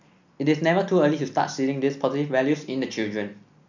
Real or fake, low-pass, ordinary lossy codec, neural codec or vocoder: real; 7.2 kHz; none; none